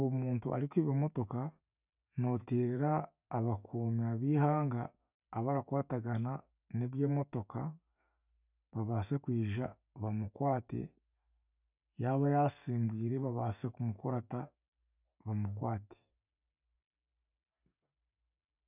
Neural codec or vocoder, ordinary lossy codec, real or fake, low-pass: none; none; real; 3.6 kHz